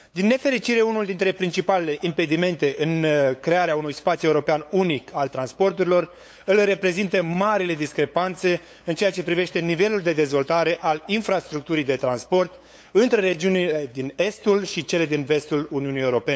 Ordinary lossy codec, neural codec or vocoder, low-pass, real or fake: none; codec, 16 kHz, 8 kbps, FunCodec, trained on LibriTTS, 25 frames a second; none; fake